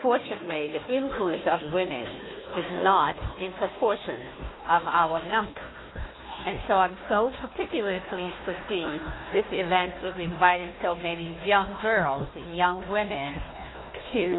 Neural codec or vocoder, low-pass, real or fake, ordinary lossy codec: codec, 16 kHz, 1 kbps, FunCodec, trained on Chinese and English, 50 frames a second; 7.2 kHz; fake; AAC, 16 kbps